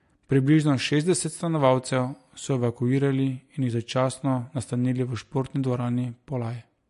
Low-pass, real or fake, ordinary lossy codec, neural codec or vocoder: 14.4 kHz; real; MP3, 48 kbps; none